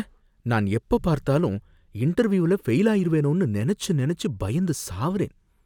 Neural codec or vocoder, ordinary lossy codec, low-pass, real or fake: none; none; 19.8 kHz; real